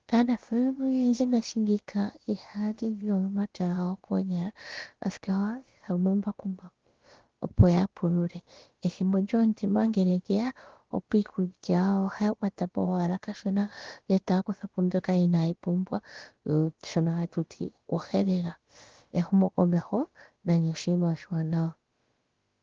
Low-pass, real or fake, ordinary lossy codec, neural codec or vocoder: 7.2 kHz; fake; Opus, 16 kbps; codec, 16 kHz, about 1 kbps, DyCAST, with the encoder's durations